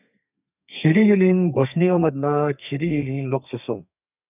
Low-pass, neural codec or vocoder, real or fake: 3.6 kHz; codec, 32 kHz, 1.9 kbps, SNAC; fake